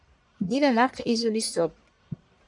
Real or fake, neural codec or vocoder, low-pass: fake; codec, 44.1 kHz, 1.7 kbps, Pupu-Codec; 10.8 kHz